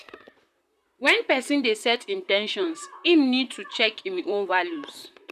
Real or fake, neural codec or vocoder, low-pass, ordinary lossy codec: fake; vocoder, 44.1 kHz, 128 mel bands, Pupu-Vocoder; 14.4 kHz; none